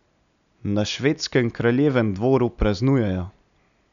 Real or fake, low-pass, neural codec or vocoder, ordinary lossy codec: real; 7.2 kHz; none; none